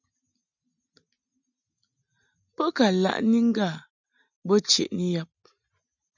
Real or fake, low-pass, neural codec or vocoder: real; 7.2 kHz; none